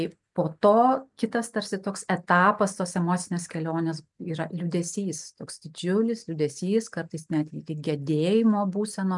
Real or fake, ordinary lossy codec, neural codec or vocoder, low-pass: real; MP3, 96 kbps; none; 10.8 kHz